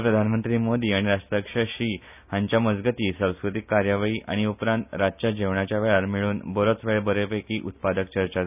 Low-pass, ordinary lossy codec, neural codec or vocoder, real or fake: 3.6 kHz; none; none; real